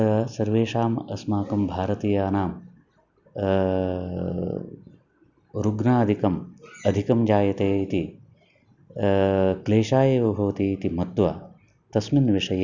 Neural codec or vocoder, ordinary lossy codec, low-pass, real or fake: none; none; 7.2 kHz; real